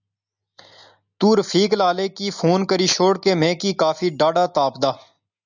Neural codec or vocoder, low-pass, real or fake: none; 7.2 kHz; real